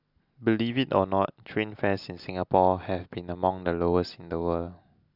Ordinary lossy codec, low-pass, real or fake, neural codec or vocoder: none; 5.4 kHz; real; none